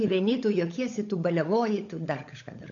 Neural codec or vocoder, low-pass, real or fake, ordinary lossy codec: codec, 16 kHz, 16 kbps, FunCodec, trained on LibriTTS, 50 frames a second; 7.2 kHz; fake; AAC, 48 kbps